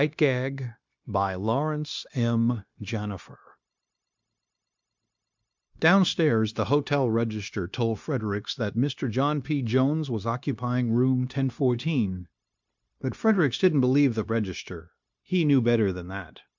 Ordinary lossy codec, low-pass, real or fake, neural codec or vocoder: MP3, 64 kbps; 7.2 kHz; fake; codec, 16 kHz, 0.9 kbps, LongCat-Audio-Codec